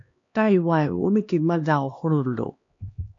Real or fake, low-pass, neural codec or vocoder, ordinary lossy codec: fake; 7.2 kHz; codec, 16 kHz, 2 kbps, X-Codec, HuBERT features, trained on LibriSpeech; AAC, 48 kbps